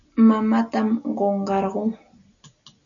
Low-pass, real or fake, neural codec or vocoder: 7.2 kHz; real; none